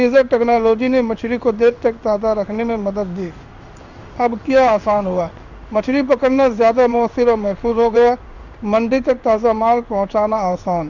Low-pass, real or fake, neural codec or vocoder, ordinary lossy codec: 7.2 kHz; fake; codec, 16 kHz in and 24 kHz out, 1 kbps, XY-Tokenizer; none